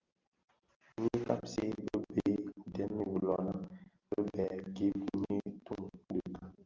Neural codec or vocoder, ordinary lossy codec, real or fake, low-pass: none; Opus, 32 kbps; real; 7.2 kHz